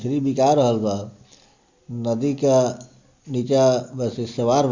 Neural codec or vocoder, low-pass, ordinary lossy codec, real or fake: none; 7.2 kHz; Opus, 64 kbps; real